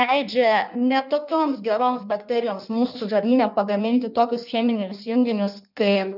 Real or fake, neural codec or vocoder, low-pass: fake; codec, 16 kHz in and 24 kHz out, 1.1 kbps, FireRedTTS-2 codec; 5.4 kHz